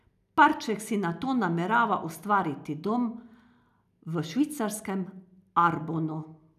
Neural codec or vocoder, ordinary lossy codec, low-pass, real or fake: none; none; 14.4 kHz; real